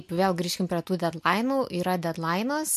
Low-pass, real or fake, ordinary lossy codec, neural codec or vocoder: 14.4 kHz; real; MP3, 64 kbps; none